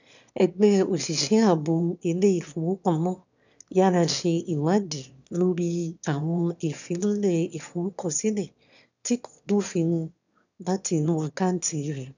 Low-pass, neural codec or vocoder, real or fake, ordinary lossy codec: 7.2 kHz; autoencoder, 22.05 kHz, a latent of 192 numbers a frame, VITS, trained on one speaker; fake; none